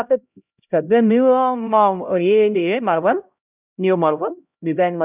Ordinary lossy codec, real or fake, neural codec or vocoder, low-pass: none; fake; codec, 16 kHz, 0.5 kbps, X-Codec, HuBERT features, trained on LibriSpeech; 3.6 kHz